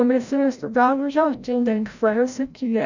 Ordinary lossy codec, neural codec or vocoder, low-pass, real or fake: none; codec, 16 kHz, 0.5 kbps, FreqCodec, larger model; 7.2 kHz; fake